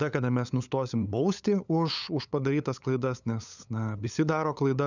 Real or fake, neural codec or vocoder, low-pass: fake; codec, 16 kHz, 8 kbps, FunCodec, trained on LibriTTS, 25 frames a second; 7.2 kHz